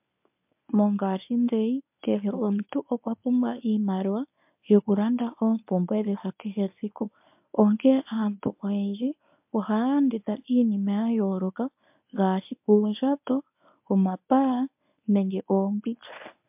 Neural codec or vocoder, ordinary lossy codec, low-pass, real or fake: codec, 24 kHz, 0.9 kbps, WavTokenizer, medium speech release version 1; MP3, 32 kbps; 3.6 kHz; fake